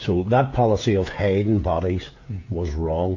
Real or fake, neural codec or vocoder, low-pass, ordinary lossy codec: fake; codec, 16 kHz, 16 kbps, FreqCodec, smaller model; 7.2 kHz; AAC, 48 kbps